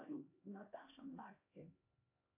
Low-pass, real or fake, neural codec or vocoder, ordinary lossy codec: 3.6 kHz; fake; codec, 16 kHz, 1 kbps, X-Codec, HuBERT features, trained on LibriSpeech; AAC, 32 kbps